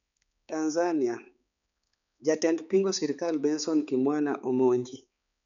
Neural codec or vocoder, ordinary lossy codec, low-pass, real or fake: codec, 16 kHz, 4 kbps, X-Codec, HuBERT features, trained on balanced general audio; none; 7.2 kHz; fake